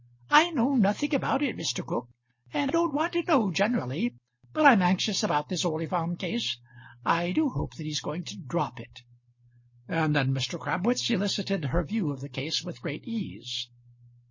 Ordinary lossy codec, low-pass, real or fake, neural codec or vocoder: MP3, 32 kbps; 7.2 kHz; real; none